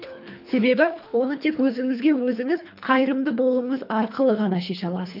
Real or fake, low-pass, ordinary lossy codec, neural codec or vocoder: fake; 5.4 kHz; none; codec, 24 kHz, 3 kbps, HILCodec